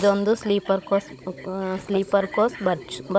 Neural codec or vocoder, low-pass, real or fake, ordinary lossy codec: codec, 16 kHz, 16 kbps, FunCodec, trained on Chinese and English, 50 frames a second; none; fake; none